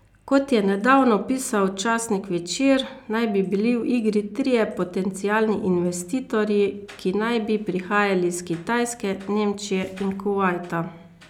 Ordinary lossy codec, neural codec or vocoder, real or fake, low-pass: none; none; real; 19.8 kHz